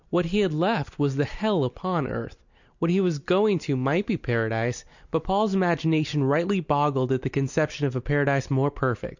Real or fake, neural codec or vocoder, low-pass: real; none; 7.2 kHz